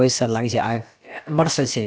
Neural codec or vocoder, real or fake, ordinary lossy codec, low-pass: codec, 16 kHz, about 1 kbps, DyCAST, with the encoder's durations; fake; none; none